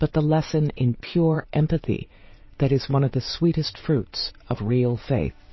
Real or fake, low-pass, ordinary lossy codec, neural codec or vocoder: fake; 7.2 kHz; MP3, 24 kbps; vocoder, 22.05 kHz, 80 mel bands, WaveNeXt